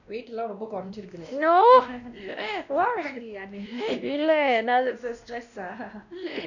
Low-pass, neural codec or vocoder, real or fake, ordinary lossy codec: 7.2 kHz; codec, 16 kHz, 1 kbps, X-Codec, WavLM features, trained on Multilingual LibriSpeech; fake; none